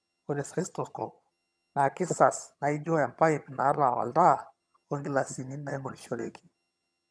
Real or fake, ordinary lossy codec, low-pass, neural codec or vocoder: fake; none; none; vocoder, 22.05 kHz, 80 mel bands, HiFi-GAN